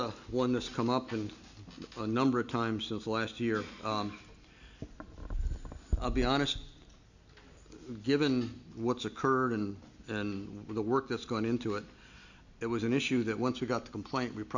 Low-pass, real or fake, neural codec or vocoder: 7.2 kHz; real; none